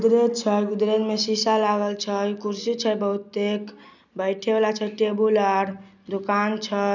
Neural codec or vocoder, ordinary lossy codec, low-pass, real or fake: none; none; 7.2 kHz; real